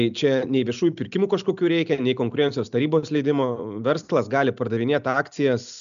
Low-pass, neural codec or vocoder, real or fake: 7.2 kHz; none; real